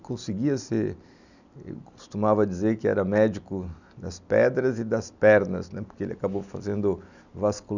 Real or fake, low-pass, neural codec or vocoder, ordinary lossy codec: real; 7.2 kHz; none; none